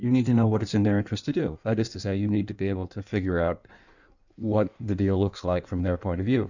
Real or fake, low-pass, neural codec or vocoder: fake; 7.2 kHz; codec, 16 kHz in and 24 kHz out, 1.1 kbps, FireRedTTS-2 codec